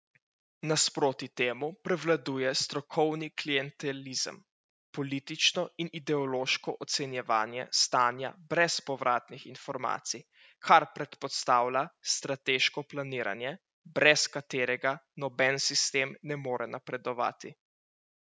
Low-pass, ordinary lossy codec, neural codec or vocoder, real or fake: none; none; none; real